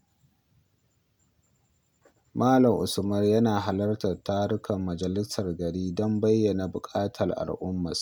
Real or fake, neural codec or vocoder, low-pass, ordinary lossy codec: real; none; none; none